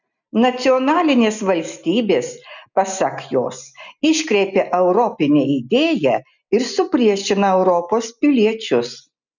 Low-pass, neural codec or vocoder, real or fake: 7.2 kHz; none; real